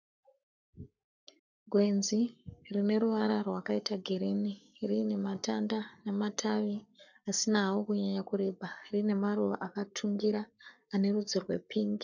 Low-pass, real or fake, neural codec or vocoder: 7.2 kHz; fake; codec, 44.1 kHz, 7.8 kbps, Pupu-Codec